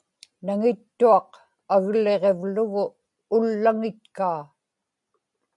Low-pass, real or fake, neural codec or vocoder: 10.8 kHz; real; none